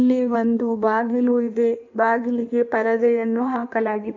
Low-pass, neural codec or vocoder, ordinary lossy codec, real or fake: 7.2 kHz; codec, 16 kHz in and 24 kHz out, 1.1 kbps, FireRedTTS-2 codec; AAC, 48 kbps; fake